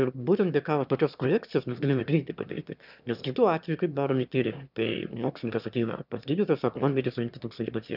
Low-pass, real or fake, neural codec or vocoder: 5.4 kHz; fake; autoencoder, 22.05 kHz, a latent of 192 numbers a frame, VITS, trained on one speaker